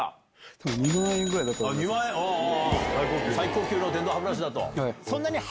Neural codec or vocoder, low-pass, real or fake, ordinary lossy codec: none; none; real; none